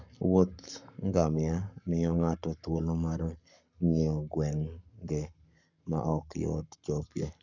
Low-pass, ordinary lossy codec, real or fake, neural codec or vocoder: 7.2 kHz; none; fake; codec, 44.1 kHz, 7.8 kbps, Pupu-Codec